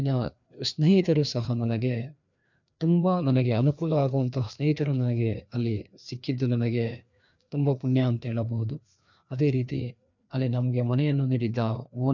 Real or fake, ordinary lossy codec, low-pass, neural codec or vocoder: fake; none; 7.2 kHz; codec, 16 kHz, 2 kbps, FreqCodec, larger model